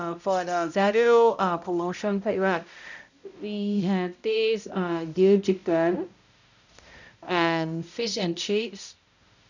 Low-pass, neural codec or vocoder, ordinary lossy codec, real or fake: 7.2 kHz; codec, 16 kHz, 0.5 kbps, X-Codec, HuBERT features, trained on balanced general audio; none; fake